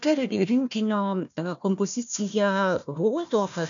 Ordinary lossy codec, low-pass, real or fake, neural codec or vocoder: MP3, 96 kbps; 7.2 kHz; fake; codec, 16 kHz, 1 kbps, FunCodec, trained on Chinese and English, 50 frames a second